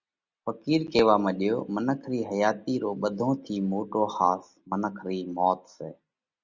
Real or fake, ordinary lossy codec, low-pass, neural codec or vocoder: real; Opus, 64 kbps; 7.2 kHz; none